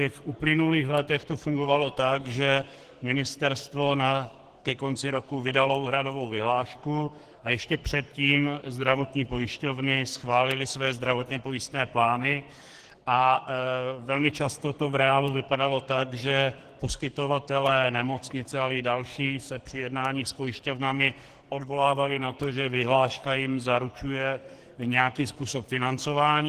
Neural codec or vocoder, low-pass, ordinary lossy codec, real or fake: codec, 44.1 kHz, 2.6 kbps, SNAC; 14.4 kHz; Opus, 16 kbps; fake